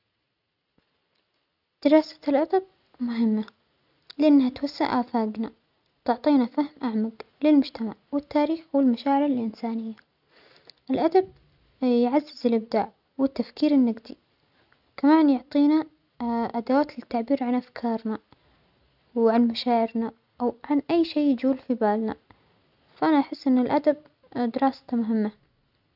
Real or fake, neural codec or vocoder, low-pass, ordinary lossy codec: real; none; 5.4 kHz; none